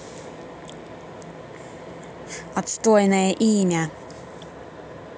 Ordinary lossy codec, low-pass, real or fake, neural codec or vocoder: none; none; real; none